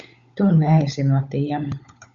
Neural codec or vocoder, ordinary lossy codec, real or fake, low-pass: codec, 16 kHz, 16 kbps, FunCodec, trained on LibriTTS, 50 frames a second; Opus, 64 kbps; fake; 7.2 kHz